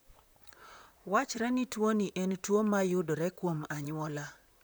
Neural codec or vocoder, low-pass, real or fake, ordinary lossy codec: vocoder, 44.1 kHz, 128 mel bands, Pupu-Vocoder; none; fake; none